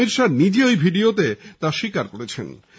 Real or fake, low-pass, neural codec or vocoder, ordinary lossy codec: real; none; none; none